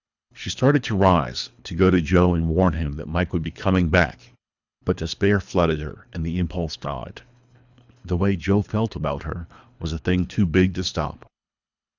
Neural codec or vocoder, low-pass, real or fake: codec, 24 kHz, 3 kbps, HILCodec; 7.2 kHz; fake